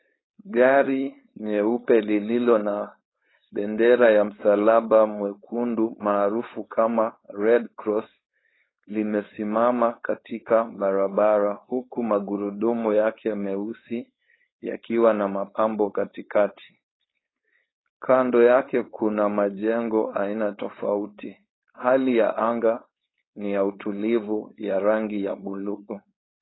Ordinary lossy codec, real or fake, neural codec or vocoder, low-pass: AAC, 16 kbps; fake; codec, 16 kHz, 4.8 kbps, FACodec; 7.2 kHz